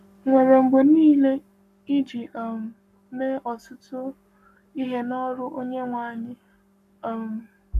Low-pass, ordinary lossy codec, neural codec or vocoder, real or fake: 14.4 kHz; none; codec, 44.1 kHz, 7.8 kbps, Pupu-Codec; fake